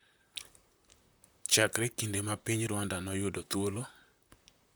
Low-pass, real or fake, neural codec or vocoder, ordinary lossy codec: none; fake; vocoder, 44.1 kHz, 128 mel bands, Pupu-Vocoder; none